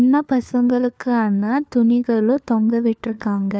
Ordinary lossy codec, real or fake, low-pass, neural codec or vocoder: none; fake; none; codec, 16 kHz, 4 kbps, FunCodec, trained on LibriTTS, 50 frames a second